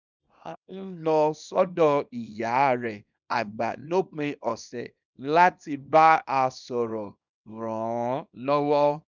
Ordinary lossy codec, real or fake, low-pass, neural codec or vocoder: none; fake; 7.2 kHz; codec, 24 kHz, 0.9 kbps, WavTokenizer, small release